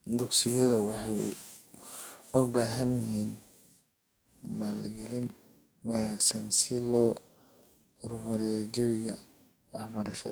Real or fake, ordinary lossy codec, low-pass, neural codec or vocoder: fake; none; none; codec, 44.1 kHz, 2.6 kbps, DAC